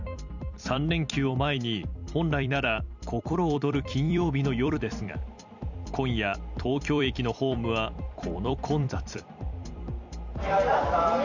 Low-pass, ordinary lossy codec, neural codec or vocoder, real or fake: 7.2 kHz; none; vocoder, 44.1 kHz, 128 mel bands every 256 samples, BigVGAN v2; fake